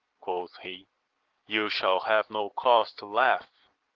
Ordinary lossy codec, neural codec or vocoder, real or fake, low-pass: Opus, 16 kbps; none; real; 7.2 kHz